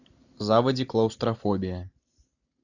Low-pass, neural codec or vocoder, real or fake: 7.2 kHz; none; real